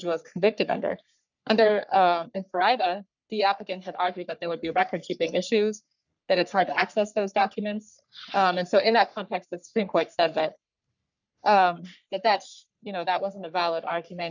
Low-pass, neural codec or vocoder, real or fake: 7.2 kHz; codec, 44.1 kHz, 3.4 kbps, Pupu-Codec; fake